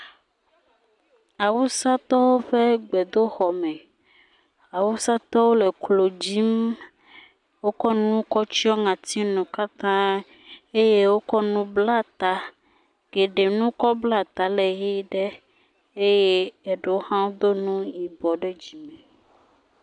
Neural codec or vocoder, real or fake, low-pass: none; real; 10.8 kHz